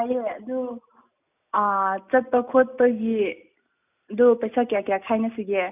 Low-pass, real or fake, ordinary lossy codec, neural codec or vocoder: 3.6 kHz; real; none; none